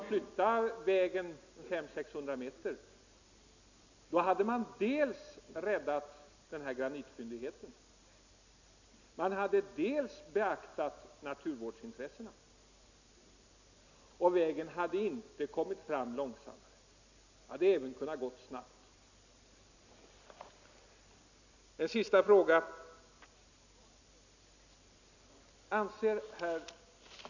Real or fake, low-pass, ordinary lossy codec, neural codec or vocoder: real; 7.2 kHz; none; none